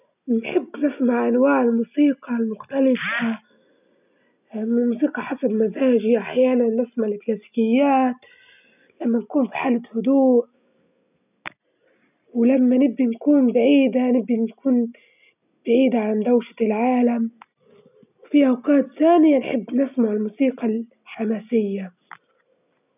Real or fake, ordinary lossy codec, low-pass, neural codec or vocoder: real; none; 3.6 kHz; none